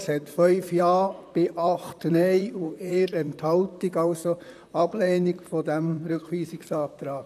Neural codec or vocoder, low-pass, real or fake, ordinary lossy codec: vocoder, 44.1 kHz, 128 mel bands, Pupu-Vocoder; 14.4 kHz; fake; none